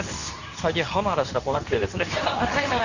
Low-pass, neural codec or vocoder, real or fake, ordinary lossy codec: 7.2 kHz; codec, 24 kHz, 0.9 kbps, WavTokenizer, medium speech release version 2; fake; none